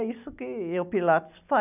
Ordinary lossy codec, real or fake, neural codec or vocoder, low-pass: none; real; none; 3.6 kHz